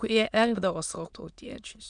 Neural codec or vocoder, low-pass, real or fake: autoencoder, 22.05 kHz, a latent of 192 numbers a frame, VITS, trained on many speakers; 9.9 kHz; fake